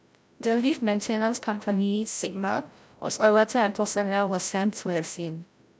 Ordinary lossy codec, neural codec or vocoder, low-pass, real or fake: none; codec, 16 kHz, 0.5 kbps, FreqCodec, larger model; none; fake